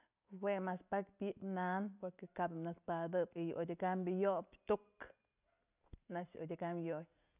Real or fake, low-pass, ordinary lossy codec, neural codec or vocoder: real; 3.6 kHz; none; none